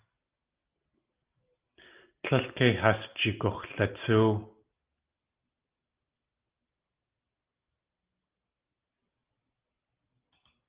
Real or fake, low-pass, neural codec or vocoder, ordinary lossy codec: real; 3.6 kHz; none; Opus, 32 kbps